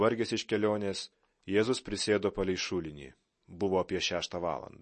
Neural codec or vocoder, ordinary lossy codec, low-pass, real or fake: none; MP3, 32 kbps; 10.8 kHz; real